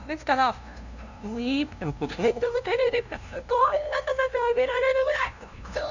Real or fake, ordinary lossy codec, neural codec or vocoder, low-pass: fake; none; codec, 16 kHz, 0.5 kbps, FunCodec, trained on LibriTTS, 25 frames a second; 7.2 kHz